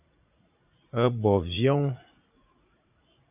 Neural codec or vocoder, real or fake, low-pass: vocoder, 44.1 kHz, 80 mel bands, Vocos; fake; 3.6 kHz